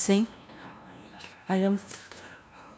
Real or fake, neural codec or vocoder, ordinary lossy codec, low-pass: fake; codec, 16 kHz, 0.5 kbps, FunCodec, trained on LibriTTS, 25 frames a second; none; none